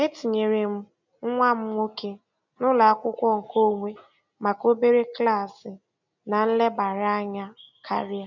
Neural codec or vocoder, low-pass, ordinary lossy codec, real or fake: none; 7.2 kHz; none; real